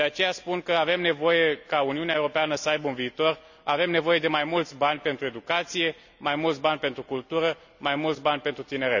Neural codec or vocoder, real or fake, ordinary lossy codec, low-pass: none; real; none; 7.2 kHz